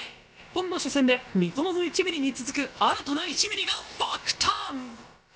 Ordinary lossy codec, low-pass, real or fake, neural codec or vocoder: none; none; fake; codec, 16 kHz, about 1 kbps, DyCAST, with the encoder's durations